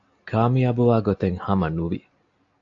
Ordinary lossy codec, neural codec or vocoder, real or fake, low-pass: AAC, 48 kbps; none; real; 7.2 kHz